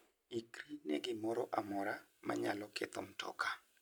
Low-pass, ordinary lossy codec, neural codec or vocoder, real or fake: none; none; none; real